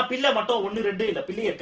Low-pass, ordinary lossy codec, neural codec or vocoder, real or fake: 7.2 kHz; Opus, 16 kbps; none; real